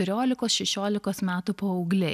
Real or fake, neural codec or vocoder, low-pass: real; none; 14.4 kHz